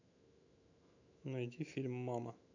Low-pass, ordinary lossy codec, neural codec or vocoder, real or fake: 7.2 kHz; none; autoencoder, 48 kHz, 128 numbers a frame, DAC-VAE, trained on Japanese speech; fake